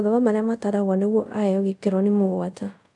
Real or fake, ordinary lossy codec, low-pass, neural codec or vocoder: fake; none; 10.8 kHz; codec, 24 kHz, 0.5 kbps, DualCodec